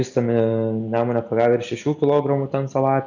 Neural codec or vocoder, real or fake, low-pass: none; real; 7.2 kHz